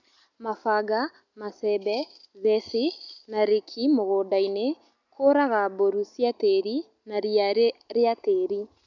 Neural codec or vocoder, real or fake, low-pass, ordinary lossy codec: none; real; 7.2 kHz; none